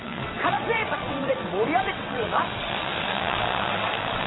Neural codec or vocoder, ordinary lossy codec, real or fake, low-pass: vocoder, 22.05 kHz, 80 mel bands, WaveNeXt; AAC, 16 kbps; fake; 7.2 kHz